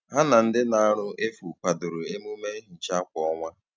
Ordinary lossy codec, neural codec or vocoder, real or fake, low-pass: none; none; real; none